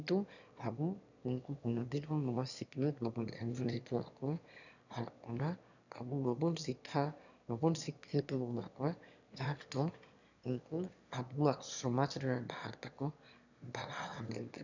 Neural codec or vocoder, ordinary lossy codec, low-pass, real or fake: autoencoder, 22.05 kHz, a latent of 192 numbers a frame, VITS, trained on one speaker; none; 7.2 kHz; fake